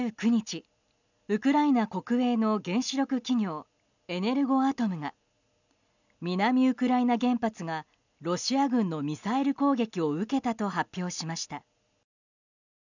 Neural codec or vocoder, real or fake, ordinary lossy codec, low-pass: none; real; none; 7.2 kHz